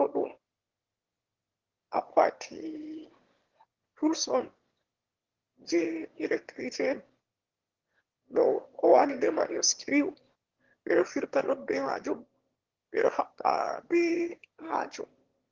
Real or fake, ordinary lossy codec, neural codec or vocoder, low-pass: fake; Opus, 16 kbps; autoencoder, 22.05 kHz, a latent of 192 numbers a frame, VITS, trained on one speaker; 7.2 kHz